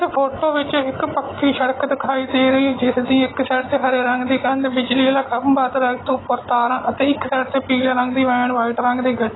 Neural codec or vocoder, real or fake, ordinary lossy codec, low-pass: none; real; AAC, 16 kbps; 7.2 kHz